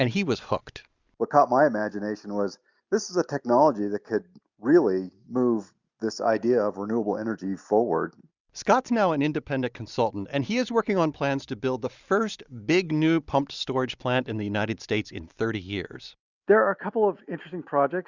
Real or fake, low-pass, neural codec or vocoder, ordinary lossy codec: real; 7.2 kHz; none; Opus, 64 kbps